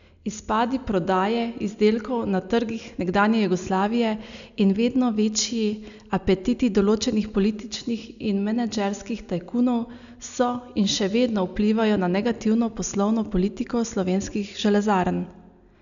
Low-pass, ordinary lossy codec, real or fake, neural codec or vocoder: 7.2 kHz; none; real; none